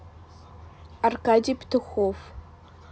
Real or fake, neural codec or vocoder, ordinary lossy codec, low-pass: real; none; none; none